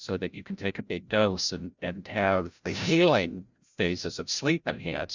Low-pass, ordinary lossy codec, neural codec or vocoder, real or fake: 7.2 kHz; Opus, 64 kbps; codec, 16 kHz, 0.5 kbps, FreqCodec, larger model; fake